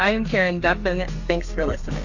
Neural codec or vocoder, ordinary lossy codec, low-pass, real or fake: codec, 32 kHz, 1.9 kbps, SNAC; AAC, 48 kbps; 7.2 kHz; fake